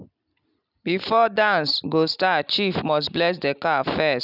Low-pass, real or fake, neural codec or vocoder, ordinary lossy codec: 5.4 kHz; real; none; none